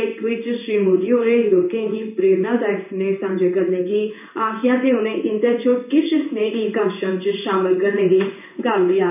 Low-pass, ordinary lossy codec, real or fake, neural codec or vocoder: 3.6 kHz; none; fake; codec, 16 kHz in and 24 kHz out, 1 kbps, XY-Tokenizer